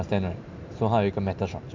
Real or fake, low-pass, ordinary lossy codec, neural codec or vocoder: real; 7.2 kHz; MP3, 64 kbps; none